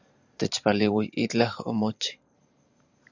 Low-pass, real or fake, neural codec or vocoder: 7.2 kHz; fake; vocoder, 44.1 kHz, 128 mel bands every 512 samples, BigVGAN v2